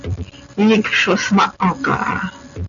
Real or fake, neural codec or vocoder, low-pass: real; none; 7.2 kHz